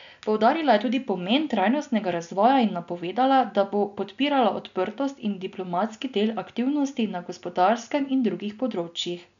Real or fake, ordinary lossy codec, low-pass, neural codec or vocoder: real; none; 7.2 kHz; none